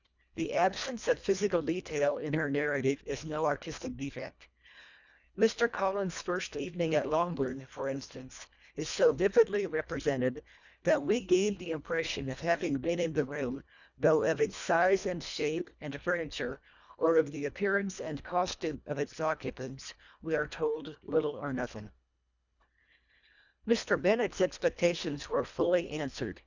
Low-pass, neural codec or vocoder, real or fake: 7.2 kHz; codec, 24 kHz, 1.5 kbps, HILCodec; fake